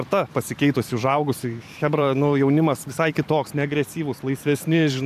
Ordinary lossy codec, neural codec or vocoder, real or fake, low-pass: AAC, 96 kbps; vocoder, 44.1 kHz, 128 mel bands every 512 samples, BigVGAN v2; fake; 14.4 kHz